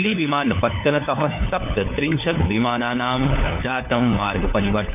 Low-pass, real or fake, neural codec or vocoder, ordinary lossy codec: 3.6 kHz; fake; codec, 16 kHz, 4 kbps, FunCodec, trained on LibriTTS, 50 frames a second; none